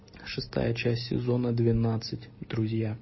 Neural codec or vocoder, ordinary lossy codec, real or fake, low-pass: none; MP3, 24 kbps; real; 7.2 kHz